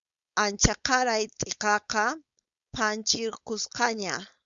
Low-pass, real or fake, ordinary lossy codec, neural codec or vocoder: 7.2 kHz; fake; Opus, 64 kbps; codec, 16 kHz, 4.8 kbps, FACodec